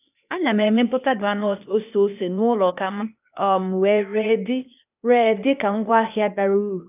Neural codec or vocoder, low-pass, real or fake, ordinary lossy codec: codec, 16 kHz, 0.8 kbps, ZipCodec; 3.6 kHz; fake; none